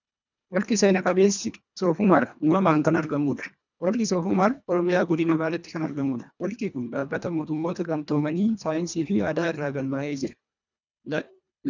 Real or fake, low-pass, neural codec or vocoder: fake; 7.2 kHz; codec, 24 kHz, 1.5 kbps, HILCodec